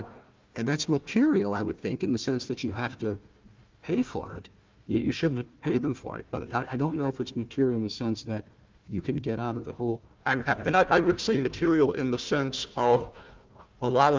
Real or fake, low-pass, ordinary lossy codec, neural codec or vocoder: fake; 7.2 kHz; Opus, 24 kbps; codec, 16 kHz, 1 kbps, FunCodec, trained on Chinese and English, 50 frames a second